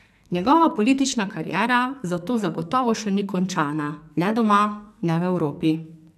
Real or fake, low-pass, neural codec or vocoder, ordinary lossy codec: fake; 14.4 kHz; codec, 44.1 kHz, 2.6 kbps, SNAC; none